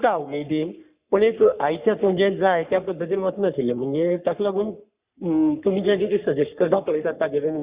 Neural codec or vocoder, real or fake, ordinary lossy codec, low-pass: codec, 44.1 kHz, 3.4 kbps, Pupu-Codec; fake; Opus, 64 kbps; 3.6 kHz